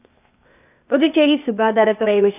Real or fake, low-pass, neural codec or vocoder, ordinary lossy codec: fake; 3.6 kHz; codec, 16 kHz, 0.8 kbps, ZipCodec; none